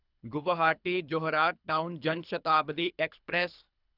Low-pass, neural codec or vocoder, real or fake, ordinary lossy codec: 5.4 kHz; codec, 24 kHz, 3 kbps, HILCodec; fake; none